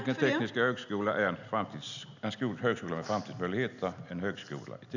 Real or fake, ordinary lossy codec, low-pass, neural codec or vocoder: real; none; 7.2 kHz; none